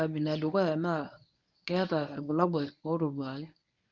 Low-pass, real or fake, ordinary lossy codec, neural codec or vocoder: 7.2 kHz; fake; none; codec, 24 kHz, 0.9 kbps, WavTokenizer, medium speech release version 1